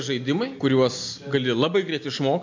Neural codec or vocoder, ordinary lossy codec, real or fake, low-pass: none; MP3, 64 kbps; real; 7.2 kHz